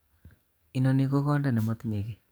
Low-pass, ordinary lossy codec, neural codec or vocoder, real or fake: none; none; codec, 44.1 kHz, 7.8 kbps, DAC; fake